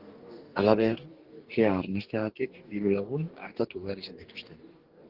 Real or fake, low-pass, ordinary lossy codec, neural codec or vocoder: fake; 5.4 kHz; Opus, 16 kbps; codec, 44.1 kHz, 2.6 kbps, DAC